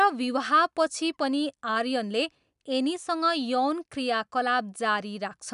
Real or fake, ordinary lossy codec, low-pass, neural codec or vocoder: real; none; 10.8 kHz; none